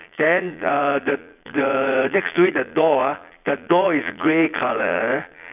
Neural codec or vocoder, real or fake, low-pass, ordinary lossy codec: vocoder, 22.05 kHz, 80 mel bands, Vocos; fake; 3.6 kHz; none